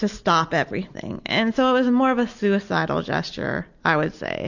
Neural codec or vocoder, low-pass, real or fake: none; 7.2 kHz; real